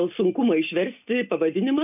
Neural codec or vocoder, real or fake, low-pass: none; real; 3.6 kHz